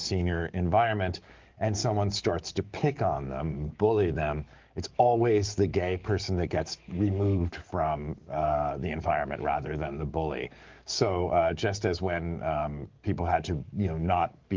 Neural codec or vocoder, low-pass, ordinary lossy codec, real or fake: codec, 44.1 kHz, 7.8 kbps, DAC; 7.2 kHz; Opus, 32 kbps; fake